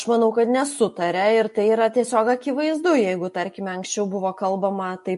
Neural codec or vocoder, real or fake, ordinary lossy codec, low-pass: none; real; MP3, 48 kbps; 14.4 kHz